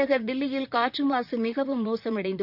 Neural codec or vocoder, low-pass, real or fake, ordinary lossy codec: vocoder, 44.1 kHz, 128 mel bands, Pupu-Vocoder; 5.4 kHz; fake; none